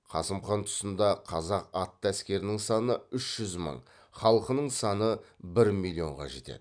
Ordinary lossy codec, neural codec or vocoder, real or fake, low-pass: none; none; real; 9.9 kHz